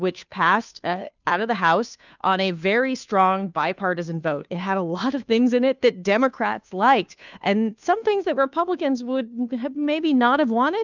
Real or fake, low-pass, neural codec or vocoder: fake; 7.2 kHz; codec, 16 kHz, 2 kbps, FunCodec, trained on Chinese and English, 25 frames a second